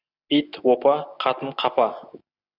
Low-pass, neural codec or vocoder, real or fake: 5.4 kHz; none; real